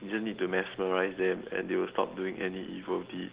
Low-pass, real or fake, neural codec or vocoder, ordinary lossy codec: 3.6 kHz; real; none; Opus, 16 kbps